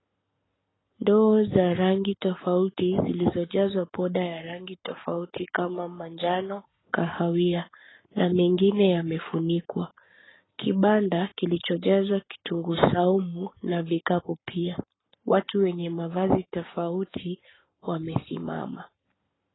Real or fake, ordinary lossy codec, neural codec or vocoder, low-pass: fake; AAC, 16 kbps; codec, 44.1 kHz, 7.8 kbps, Pupu-Codec; 7.2 kHz